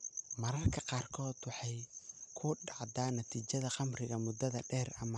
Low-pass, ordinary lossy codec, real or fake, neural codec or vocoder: 9.9 kHz; none; real; none